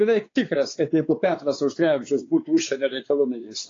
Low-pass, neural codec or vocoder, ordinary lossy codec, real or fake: 7.2 kHz; codec, 16 kHz, 4 kbps, X-Codec, WavLM features, trained on Multilingual LibriSpeech; AAC, 32 kbps; fake